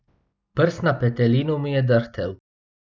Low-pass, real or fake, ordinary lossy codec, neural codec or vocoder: none; real; none; none